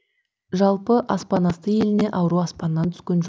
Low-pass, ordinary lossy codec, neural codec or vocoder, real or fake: none; none; none; real